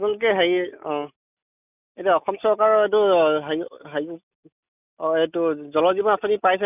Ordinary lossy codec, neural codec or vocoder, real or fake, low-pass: none; none; real; 3.6 kHz